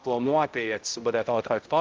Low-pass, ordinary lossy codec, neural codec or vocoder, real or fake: 7.2 kHz; Opus, 32 kbps; codec, 16 kHz, 0.5 kbps, X-Codec, HuBERT features, trained on balanced general audio; fake